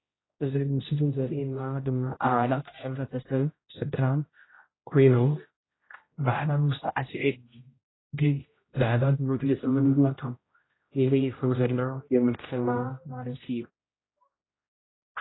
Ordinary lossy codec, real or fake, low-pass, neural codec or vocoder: AAC, 16 kbps; fake; 7.2 kHz; codec, 16 kHz, 0.5 kbps, X-Codec, HuBERT features, trained on general audio